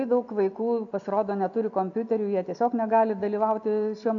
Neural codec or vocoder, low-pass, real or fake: none; 7.2 kHz; real